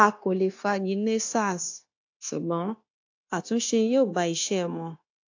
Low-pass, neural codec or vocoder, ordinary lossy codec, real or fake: 7.2 kHz; codec, 16 kHz, 0.9 kbps, LongCat-Audio-Codec; AAC, 48 kbps; fake